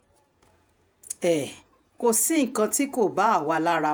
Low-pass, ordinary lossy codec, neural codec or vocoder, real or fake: none; none; vocoder, 48 kHz, 128 mel bands, Vocos; fake